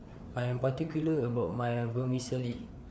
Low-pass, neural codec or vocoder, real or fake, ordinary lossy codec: none; codec, 16 kHz, 4 kbps, FreqCodec, larger model; fake; none